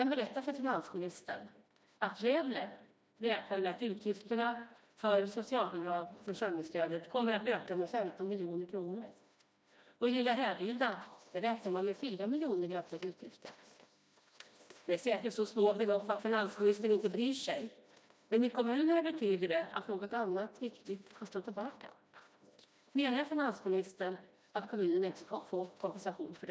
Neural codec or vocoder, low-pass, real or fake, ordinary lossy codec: codec, 16 kHz, 1 kbps, FreqCodec, smaller model; none; fake; none